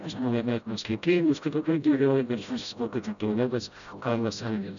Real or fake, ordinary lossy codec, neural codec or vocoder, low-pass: fake; MP3, 96 kbps; codec, 16 kHz, 0.5 kbps, FreqCodec, smaller model; 7.2 kHz